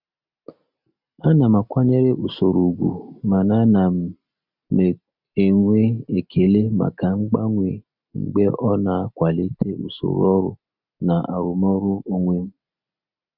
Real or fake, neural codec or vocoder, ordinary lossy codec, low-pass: real; none; none; 5.4 kHz